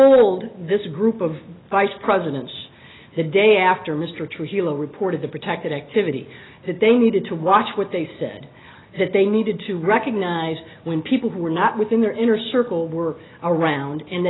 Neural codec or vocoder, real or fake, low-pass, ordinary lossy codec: none; real; 7.2 kHz; AAC, 16 kbps